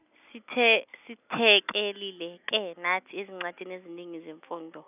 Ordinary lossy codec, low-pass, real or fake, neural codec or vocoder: none; 3.6 kHz; real; none